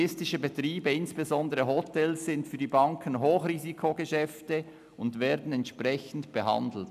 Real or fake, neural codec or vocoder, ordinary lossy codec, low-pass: real; none; none; 14.4 kHz